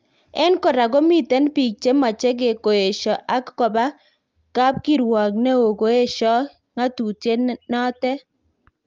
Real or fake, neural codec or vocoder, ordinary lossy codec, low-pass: real; none; Opus, 32 kbps; 7.2 kHz